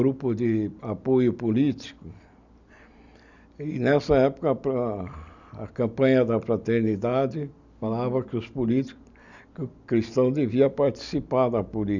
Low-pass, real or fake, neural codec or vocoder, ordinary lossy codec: 7.2 kHz; real; none; none